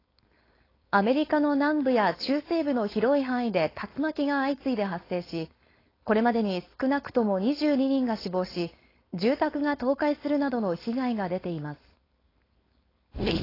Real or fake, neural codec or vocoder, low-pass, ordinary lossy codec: fake; codec, 16 kHz, 4.8 kbps, FACodec; 5.4 kHz; AAC, 24 kbps